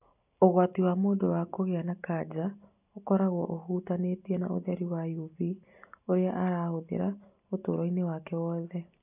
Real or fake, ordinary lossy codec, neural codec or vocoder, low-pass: real; none; none; 3.6 kHz